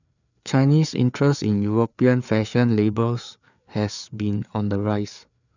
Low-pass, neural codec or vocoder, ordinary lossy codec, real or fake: 7.2 kHz; codec, 16 kHz, 4 kbps, FreqCodec, larger model; none; fake